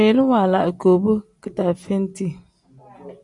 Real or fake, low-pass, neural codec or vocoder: real; 10.8 kHz; none